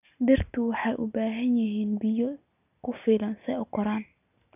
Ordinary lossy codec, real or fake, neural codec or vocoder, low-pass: none; real; none; 3.6 kHz